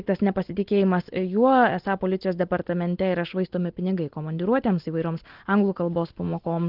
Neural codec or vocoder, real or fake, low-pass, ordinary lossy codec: none; real; 5.4 kHz; Opus, 16 kbps